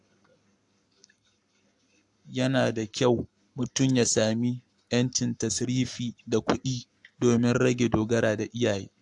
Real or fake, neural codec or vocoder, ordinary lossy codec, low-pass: fake; codec, 44.1 kHz, 7.8 kbps, Pupu-Codec; AAC, 64 kbps; 10.8 kHz